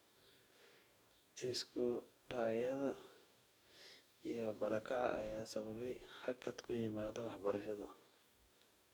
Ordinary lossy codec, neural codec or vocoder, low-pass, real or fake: none; codec, 44.1 kHz, 2.6 kbps, DAC; none; fake